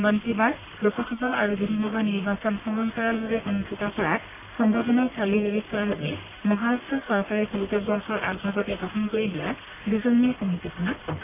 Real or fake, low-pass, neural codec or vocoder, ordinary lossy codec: fake; 3.6 kHz; codec, 44.1 kHz, 1.7 kbps, Pupu-Codec; none